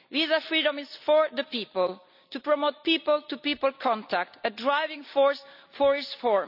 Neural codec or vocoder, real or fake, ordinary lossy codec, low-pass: none; real; none; 5.4 kHz